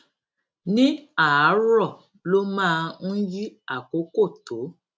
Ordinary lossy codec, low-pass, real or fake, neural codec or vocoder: none; none; real; none